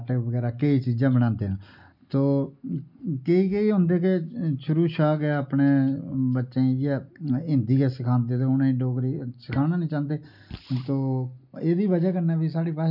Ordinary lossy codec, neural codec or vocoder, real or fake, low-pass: none; none; real; 5.4 kHz